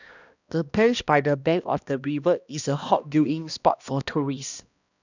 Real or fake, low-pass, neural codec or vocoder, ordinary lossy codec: fake; 7.2 kHz; codec, 16 kHz, 1 kbps, X-Codec, HuBERT features, trained on balanced general audio; none